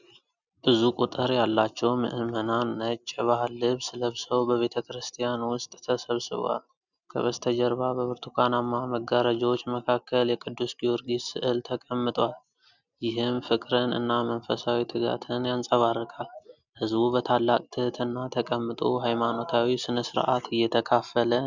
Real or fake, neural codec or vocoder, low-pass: real; none; 7.2 kHz